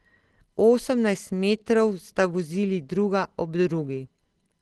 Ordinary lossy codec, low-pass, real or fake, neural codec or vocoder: Opus, 16 kbps; 10.8 kHz; real; none